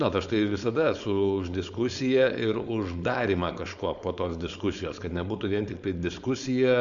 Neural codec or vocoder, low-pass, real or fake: codec, 16 kHz, 4.8 kbps, FACodec; 7.2 kHz; fake